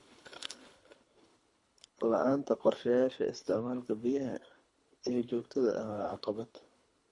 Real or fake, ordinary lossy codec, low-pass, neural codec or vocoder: fake; MP3, 48 kbps; 10.8 kHz; codec, 24 kHz, 3 kbps, HILCodec